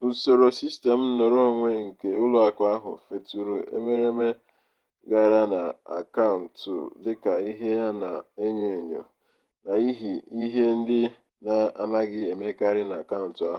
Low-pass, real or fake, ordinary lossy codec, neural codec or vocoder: 19.8 kHz; fake; Opus, 24 kbps; vocoder, 48 kHz, 128 mel bands, Vocos